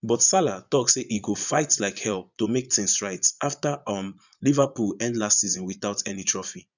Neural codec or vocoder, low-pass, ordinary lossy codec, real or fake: vocoder, 22.05 kHz, 80 mel bands, Vocos; 7.2 kHz; none; fake